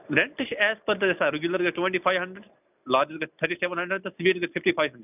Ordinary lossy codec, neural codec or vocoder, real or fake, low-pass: none; none; real; 3.6 kHz